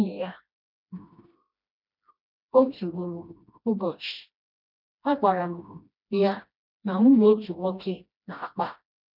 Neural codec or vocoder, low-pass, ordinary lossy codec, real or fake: codec, 16 kHz, 1 kbps, FreqCodec, smaller model; 5.4 kHz; none; fake